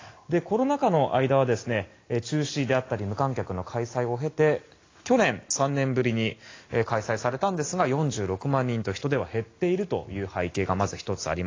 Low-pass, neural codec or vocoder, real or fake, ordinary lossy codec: 7.2 kHz; none; real; AAC, 32 kbps